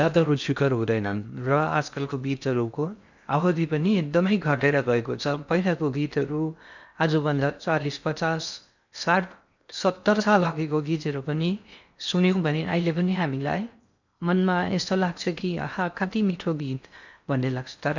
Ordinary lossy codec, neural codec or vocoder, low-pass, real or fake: none; codec, 16 kHz in and 24 kHz out, 0.6 kbps, FocalCodec, streaming, 2048 codes; 7.2 kHz; fake